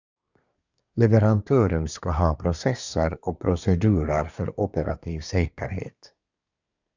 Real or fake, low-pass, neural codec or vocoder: fake; 7.2 kHz; codec, 24 kHz, 1 kbps, SNAC